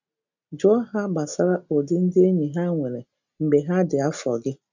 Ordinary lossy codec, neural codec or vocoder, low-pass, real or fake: none; none; 7.2 kHz; real